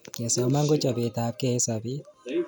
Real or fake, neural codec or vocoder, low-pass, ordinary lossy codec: real; none; none; none